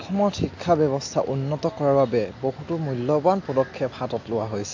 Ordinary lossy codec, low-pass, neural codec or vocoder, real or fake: AAC, 48 kbps; 7.2 kHz; none; real